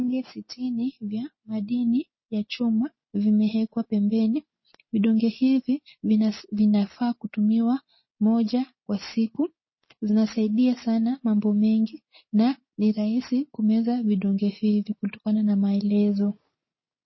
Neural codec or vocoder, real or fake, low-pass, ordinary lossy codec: none; real; 7.2 kHz; MP3, 24 kbps